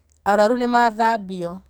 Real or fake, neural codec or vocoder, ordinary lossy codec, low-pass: fake; codec, 44.1 kHz, 2.6 kbps, SNAC; none; none